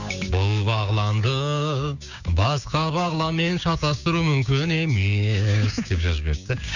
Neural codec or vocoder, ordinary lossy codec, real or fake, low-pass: codec, 16 kHz, 6 kbps, DAC; none; fake; 7.2 kHz